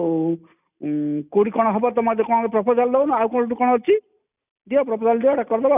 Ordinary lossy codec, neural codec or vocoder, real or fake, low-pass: none; none; real; 3.6 kHz